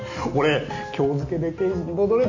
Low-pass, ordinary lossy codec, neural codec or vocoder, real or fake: 7.2 kHz; Opus, 64 kbps; none; real